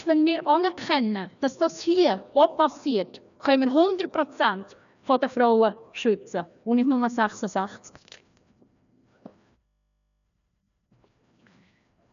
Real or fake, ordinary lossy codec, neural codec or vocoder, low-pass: fake; none; codec, 16 kHz, 1 kbps, FreqCodec, larger model; 7.2 kHz